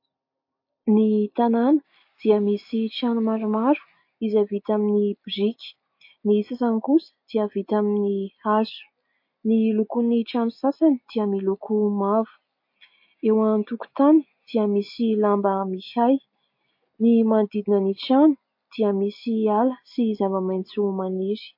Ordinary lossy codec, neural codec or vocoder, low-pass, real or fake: MP3, 32 kbps; none; 5.4 kHz; real